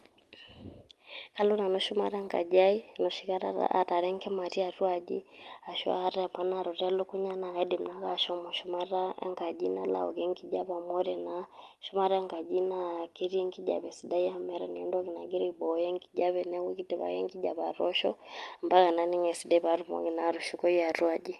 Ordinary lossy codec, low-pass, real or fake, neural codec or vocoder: Opus, 32 kbps; 14.4 kHz; real; none